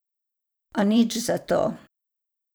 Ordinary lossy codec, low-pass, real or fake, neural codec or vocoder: none; none; fake; vocoder, 44.1 kHz, 128 mel bands every 512 samples, BigVGAN v2